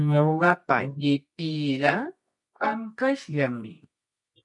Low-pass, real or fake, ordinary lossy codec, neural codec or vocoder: 10.8 kHz; fake; MP3, 48 kbps; codec, 24 kHz, 0.9 kbps, WavTokenizer, medium music audio release